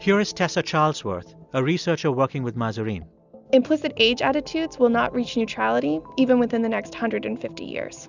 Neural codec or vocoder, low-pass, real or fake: none; 7.2 kHz; real